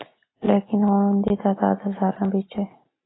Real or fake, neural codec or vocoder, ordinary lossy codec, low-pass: real; none; AAC, 16 kbps; 7.2 kHz